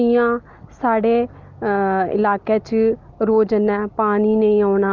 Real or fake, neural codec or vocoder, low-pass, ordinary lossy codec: real; none; 7.2 kHz; Opus, 24 kbps